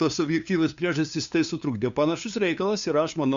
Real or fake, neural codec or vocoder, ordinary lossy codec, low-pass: fake; codec, 16 kHz, 2 kbps, FunCodec, trained on LibriTTS, 25 frames a second; Opus, 64 kbps; 7.2 kHz